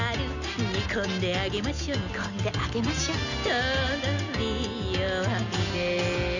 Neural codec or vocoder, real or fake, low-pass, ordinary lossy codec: none; real; 7.2 kHz; none